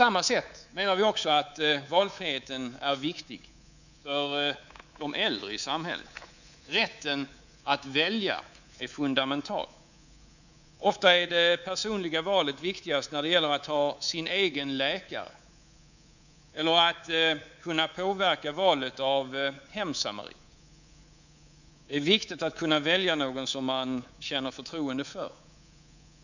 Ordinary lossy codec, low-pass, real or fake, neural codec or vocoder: none; 7.2 kHz; fake; codec, 24 kHz, 3.1 kbps, DualCodec